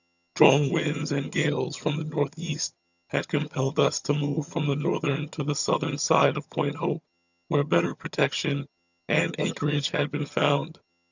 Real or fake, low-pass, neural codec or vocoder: fake; 7.2 kHz; vocoder, 22.05 kHz, 80 mel bands, HiFi-GAN